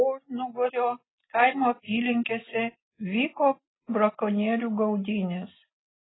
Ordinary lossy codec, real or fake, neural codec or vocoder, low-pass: AAC, 16 kbps; real; none; 7.2 kHz